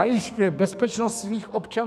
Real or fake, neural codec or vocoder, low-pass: fake; codec, 32 kHz, 1.9 kbps, SNAC; 14.4 kHz